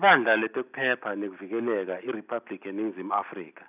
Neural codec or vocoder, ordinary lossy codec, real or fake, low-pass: none; none; real; 3.6 kHz